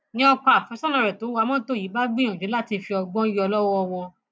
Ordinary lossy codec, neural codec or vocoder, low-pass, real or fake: none; none; none; real